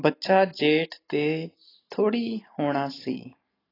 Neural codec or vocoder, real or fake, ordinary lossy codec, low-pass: none; real; AAC, 24 kbps; 5.4 kHz